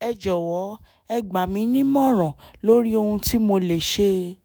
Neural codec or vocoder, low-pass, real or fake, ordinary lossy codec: autoencoder, 48 kHz, 128 numbers a frame, DAC-VAE, trained on Japanese speech; none; fake; none